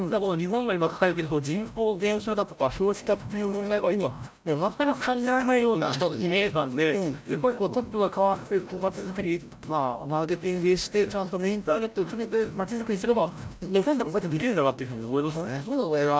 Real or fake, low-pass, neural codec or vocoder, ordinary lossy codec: fake; none; codec, 16 kHz, 0.5 kbps, FreqCodec, larger model; none